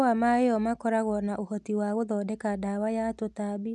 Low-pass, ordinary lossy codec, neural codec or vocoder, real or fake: none; none; none; real